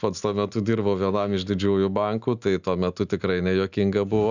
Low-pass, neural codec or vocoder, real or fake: 7.2 kHz; none; real